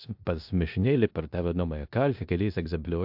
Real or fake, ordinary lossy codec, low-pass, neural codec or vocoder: fake; AAC, 48 kbps; 5.4 kHz; codec, 16 kHz in and 24 kHz out, 0.9 kbps, LongCat-Audio-Codec, four codebook decoder